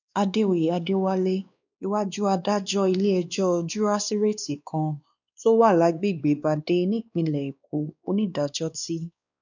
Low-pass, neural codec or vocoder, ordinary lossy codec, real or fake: 7.2 kHz; codec, 16 kHz, 2 kbps, X-Codec, WavLM features, trained on Multilingual LibriSpeech; none; fake